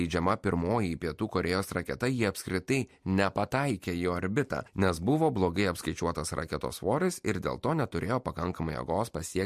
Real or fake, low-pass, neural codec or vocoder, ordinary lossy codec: real; 14.4 kHz; none; MP3, 64 kbps